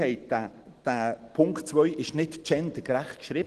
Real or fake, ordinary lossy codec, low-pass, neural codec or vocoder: real; Opus, 16 kbps; 10.8 kHz; none